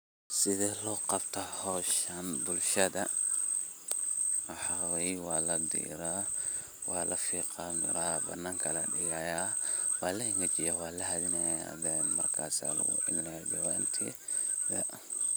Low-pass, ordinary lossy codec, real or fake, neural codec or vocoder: none; none; real; none